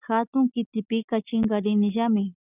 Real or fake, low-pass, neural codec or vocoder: real; 3.6 kHz; none